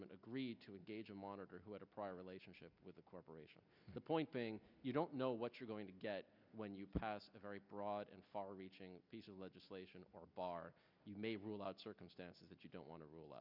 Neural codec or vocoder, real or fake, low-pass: none; real; 5.4 kHz